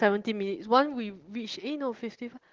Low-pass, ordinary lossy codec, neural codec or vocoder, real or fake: 7.2 kHz; Opus, 16 kbps; none; real